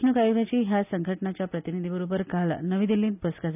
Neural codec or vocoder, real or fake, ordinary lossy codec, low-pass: none; real; none; 3.6 kHz